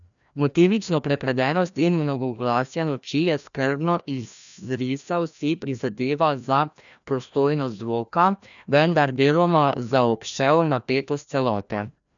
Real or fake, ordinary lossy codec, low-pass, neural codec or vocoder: fake; none; 7.2 kHz; codec, 16 kHz, 1 kbps, FreqCodec, larger model